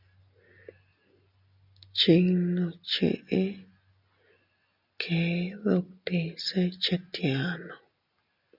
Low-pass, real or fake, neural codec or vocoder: 5.4 kHz; real; none